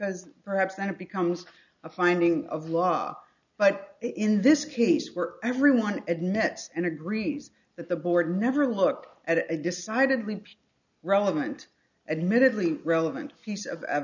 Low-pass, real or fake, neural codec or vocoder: 7.2 kHz; real; none